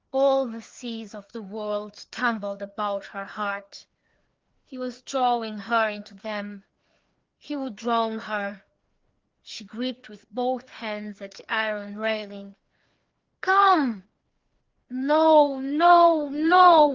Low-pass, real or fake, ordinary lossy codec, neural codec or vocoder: 7.2 kHz; fake; Opus, 16 kbps; codec, 16 kHz, 2 kbps, FreqCodec, larger model